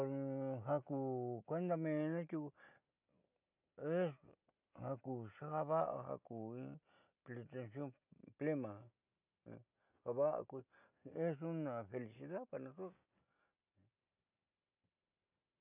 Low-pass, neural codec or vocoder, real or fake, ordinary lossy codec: 3.6 kHz; none; real; none